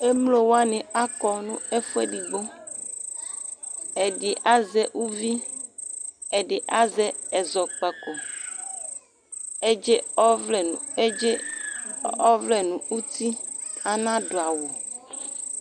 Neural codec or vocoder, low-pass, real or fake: none; 9.9 kHz; real